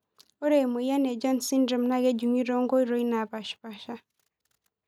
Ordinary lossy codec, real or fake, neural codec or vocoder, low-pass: none; real; none; 19.8 kHz